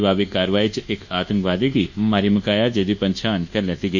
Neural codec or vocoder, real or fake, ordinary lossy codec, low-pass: codec, 24 kHz, 1.2 kbps, DualCodec; fake; none; 7.2 kHz